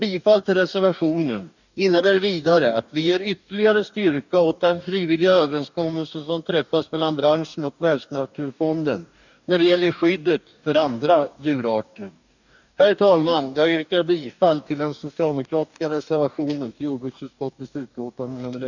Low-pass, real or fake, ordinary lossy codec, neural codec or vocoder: 7.2 kHz; fake; none; codec, 44.1 kHz, 2.6 kbps, DAC